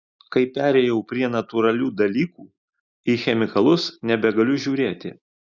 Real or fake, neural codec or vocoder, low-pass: real; none; 7.2 kHz